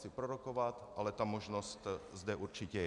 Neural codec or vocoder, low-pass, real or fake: none; 10.8 kHz; real